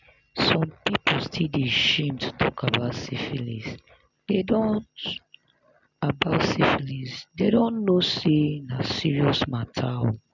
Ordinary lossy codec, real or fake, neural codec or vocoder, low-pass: none; real; none; 7.2 kHz